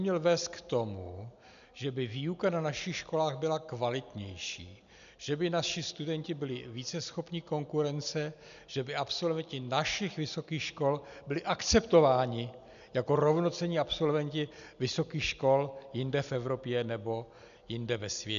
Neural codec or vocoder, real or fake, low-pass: none; real; 7.2 kHz